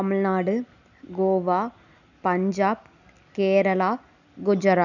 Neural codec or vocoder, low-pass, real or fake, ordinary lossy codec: none; 7.2 kHz; real; none